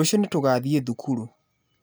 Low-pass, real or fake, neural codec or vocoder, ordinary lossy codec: none; real; none; none